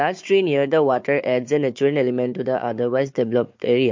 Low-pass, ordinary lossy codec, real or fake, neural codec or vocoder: 7.2 kHz; MP3, 48 kbps; fake; codec, 16 kHz, 4 kbps, FunCodec, trained on Chinese and English, 50 frames a second